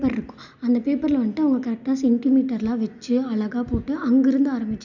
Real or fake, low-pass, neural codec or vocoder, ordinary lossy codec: real; 7.2 kHz; none; none